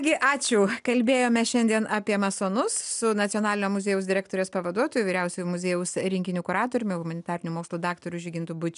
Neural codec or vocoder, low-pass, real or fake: none; 10.8 kHz; real